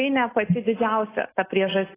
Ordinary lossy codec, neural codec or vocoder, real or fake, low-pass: AAC, 16 kbps; none; real; 3.6 kHz